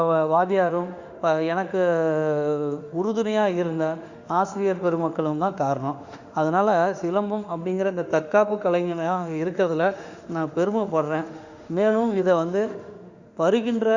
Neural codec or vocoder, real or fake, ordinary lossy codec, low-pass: autoencoder, 48 kHz, 32 numbers a frame, DAC-VAE, trained on Japanese speech; fake; Opus, 64 kbps; 7.2 kHz